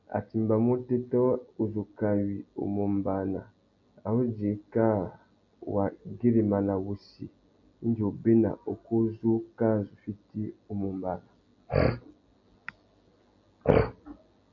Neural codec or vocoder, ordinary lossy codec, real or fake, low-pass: none; AAC, 48 kbps; real; 7.2 kHz